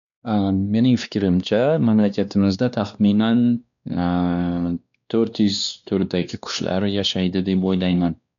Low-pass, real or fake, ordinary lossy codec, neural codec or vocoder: 7.2 kHz; fake; MP3, 96 kbps; codec, 16 kHz, 2 kbps, X-Codec, WavLM features, trained on Multilingual LibriSpeech